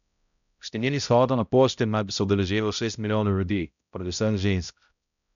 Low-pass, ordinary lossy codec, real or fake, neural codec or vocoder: 7.2 kHz; none; fake; codec, 16 kHz, 0.5 kbps, X-Codec, HuBERT features, trained on balanced general audio